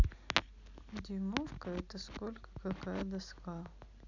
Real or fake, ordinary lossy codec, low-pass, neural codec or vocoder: real; none; 7.2 kHz; none